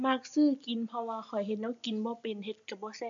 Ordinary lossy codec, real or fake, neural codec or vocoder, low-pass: AAC, 64 kbps; real; none; 7.2 kHz